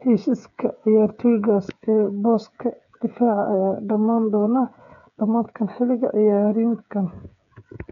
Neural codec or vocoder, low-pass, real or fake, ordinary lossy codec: codec, 16 kHz, 8 kbps, FreqCodec, smaller model; 7.2 kHz; fake; none